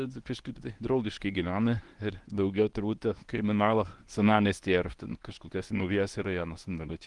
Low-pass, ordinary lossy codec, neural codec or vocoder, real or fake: 10.8 kHz; Opus, 24 kbps; codec, 24 kHz, 0.9 kbps, WavTokenizer, medium speech release version 1; fake